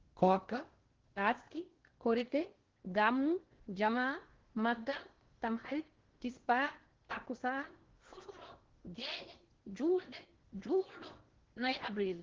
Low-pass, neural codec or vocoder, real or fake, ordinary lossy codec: 7.2 kHz; codec, 16 kHz in and 24 kHz out, 0.8 kbps, FocalCodec, streaming, 65536 codes; fake; Opus, 16 kbps